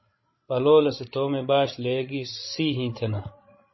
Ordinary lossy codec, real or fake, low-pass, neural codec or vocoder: MP3, 24 kbps; fake; 7.2 kHz; codec, 16 kHz, 16 kbps, FreqCodec, larger model